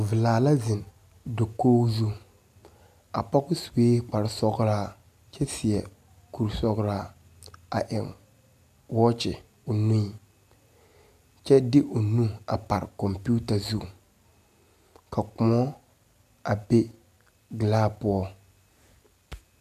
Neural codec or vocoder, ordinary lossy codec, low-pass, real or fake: none; AAC, 96 kbps; 14.4 kHz; real